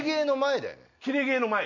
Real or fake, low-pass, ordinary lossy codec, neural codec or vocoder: real; 7.2 kHz; none; none